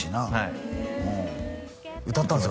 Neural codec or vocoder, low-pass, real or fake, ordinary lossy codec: none; none; real; none